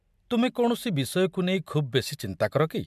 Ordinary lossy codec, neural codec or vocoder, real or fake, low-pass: MP3, 96 kbps; none; real; 14.4 kHz